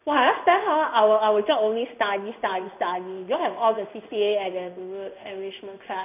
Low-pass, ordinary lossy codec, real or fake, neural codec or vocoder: 3.6 kHz; none; fake; codec, 16 kHz in and 24 kHz out, 1 kbps, XY-Tokenizer